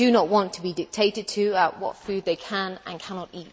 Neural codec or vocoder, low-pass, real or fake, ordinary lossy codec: none; none; real; none